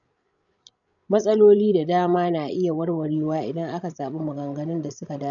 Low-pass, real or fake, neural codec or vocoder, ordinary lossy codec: 7.2 kHz; fake; codec, 16 kHz, 16 kbps, FreqCodec, larger model; none